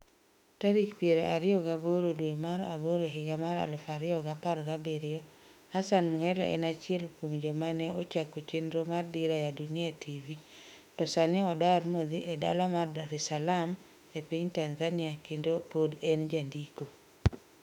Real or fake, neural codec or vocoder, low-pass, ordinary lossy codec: fake; autoencoder, 48 kHz, 32 numbers a frame, DAC-VAE, trained on Japanese speech; 19.8 kHz; none